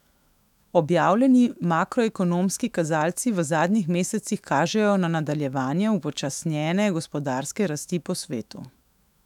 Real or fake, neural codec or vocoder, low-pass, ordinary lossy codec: fake; autoencoder, 48 kHz, 128 numbers a frame, DAC-VAE, trained on Japanese speech; 19.8 kHz; none